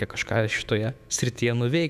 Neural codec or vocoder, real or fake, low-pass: autoencoder, 48 kHz, 128 numbers a frame, DAC-VAE, trained on Japanese speech; fake; 14.4 kHz